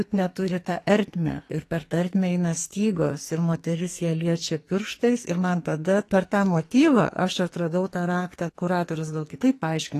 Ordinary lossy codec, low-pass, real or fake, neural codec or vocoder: AAC, 48 kbps; 14.4 kHz; fake; codec, 44.1 kHz, 2.6 kbps, SNAC